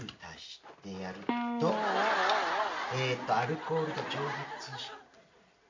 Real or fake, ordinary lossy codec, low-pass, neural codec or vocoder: real; MP3, 48 kbps; 7.2 kHz; none